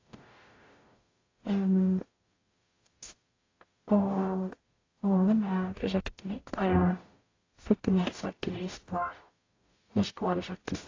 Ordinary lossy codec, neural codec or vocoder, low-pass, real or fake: AAC, 32 kbps; codec, 44.1 kHz, 0.9 kbps, DAC; 7.2 kHz; fake